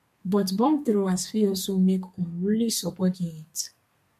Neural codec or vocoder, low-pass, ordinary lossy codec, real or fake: codec, 32 kHz, 1.9 kbps, SNAC; 14.4 kHz; MP3, 64 kbps; fake